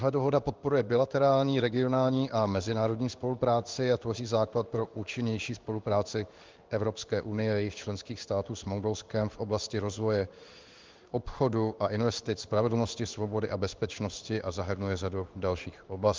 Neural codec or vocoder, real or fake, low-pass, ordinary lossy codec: codec, 16 kHz in and 24 kHz out, 1 kbps, XY-Tokenizer; fake; 7.2 kHz; Opus, 32 kbps